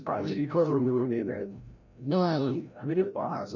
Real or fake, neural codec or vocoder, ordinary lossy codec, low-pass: fake; codec, 16 kHz, 0.5 kbps, FreqCodec, larger model; none; 7.2 kHz